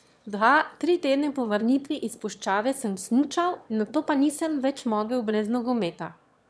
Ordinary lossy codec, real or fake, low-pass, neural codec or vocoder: none; fake; none; autoencoder, 22.05 kHz, a latent of 192 numbers a frame, VITS, trained on one speaker